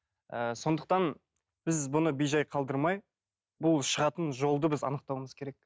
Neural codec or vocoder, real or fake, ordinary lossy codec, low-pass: none; real; none; none